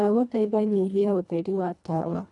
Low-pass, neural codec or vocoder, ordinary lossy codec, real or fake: 10.8 kHz; codec, 24 kHz, 1.5 kbps, HILCodec; none; fake